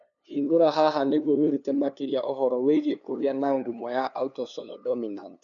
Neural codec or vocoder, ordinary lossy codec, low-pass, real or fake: codec, 16 kHz, 2 kbps, FunCodec, trained on LibriTTS, 25 frames a second; none; 7.2 kHz; fake